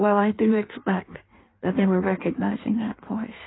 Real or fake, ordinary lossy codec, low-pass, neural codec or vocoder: fake; AAC, 16 kbps; 7.2 kHz; codec, 16 kHz in and 24 kHz out, 1.1 kbps, FireRedTTS-2 codec